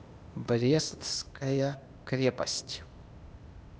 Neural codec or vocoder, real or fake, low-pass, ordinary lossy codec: codec, 16 kHz, 0.8 kbps, ZipCodec; fake; none; none